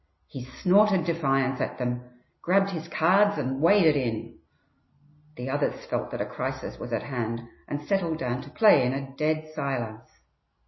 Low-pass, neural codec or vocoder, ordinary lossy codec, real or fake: 7.2 kHz; none; MP3, 24 kbps; real